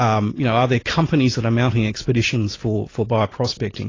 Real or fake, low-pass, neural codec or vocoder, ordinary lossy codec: real; 7.2 kHz; none; AAC, 32 kbps